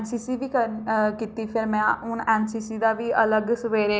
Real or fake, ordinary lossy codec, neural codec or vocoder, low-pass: real; none; none; none